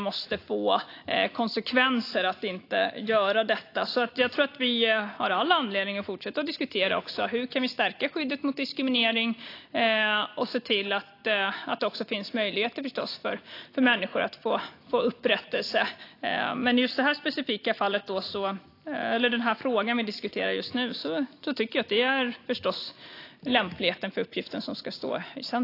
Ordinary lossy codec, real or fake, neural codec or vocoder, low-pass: AAC, 32 kbps; real; none; 5.4 kHz